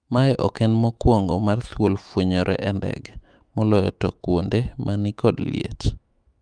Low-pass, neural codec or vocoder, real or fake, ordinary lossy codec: 9.9 kHz; autoencoder, 48 kHz, 128 numbers a frame, DAC-VAE, trained on Japanese speech; fake; Opus, 32 kbps